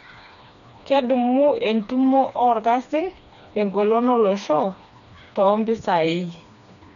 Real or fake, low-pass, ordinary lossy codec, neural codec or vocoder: fake; 7.2 kHz; none; codec, 16 kHz, 2 kbps, FreqCodec, smaller model